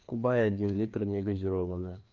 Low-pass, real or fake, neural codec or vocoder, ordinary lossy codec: 7.2 kHz; fake; codec, 16 kHz, 2 kbps, FreqCodec, larger model; Opus, 24 kbps